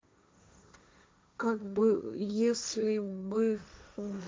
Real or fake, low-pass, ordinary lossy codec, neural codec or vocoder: fake; none; none; codec, 16 kHz, 1.1 kbps, Voila-Tokenizer